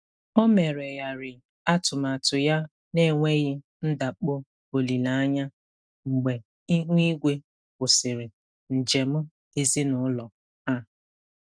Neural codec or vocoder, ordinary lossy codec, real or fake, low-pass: none; none; real; 9.9 kHz